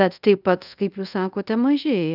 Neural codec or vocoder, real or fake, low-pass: codec, 24 kHz, 0.5 kbps, DualCodec; fake; 5.4 kHz